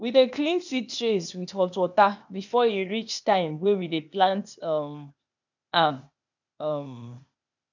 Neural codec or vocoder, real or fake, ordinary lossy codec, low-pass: codec, 16 kHz, 0.8 kbps, ZipCodec; fake; none; 7.2 kHz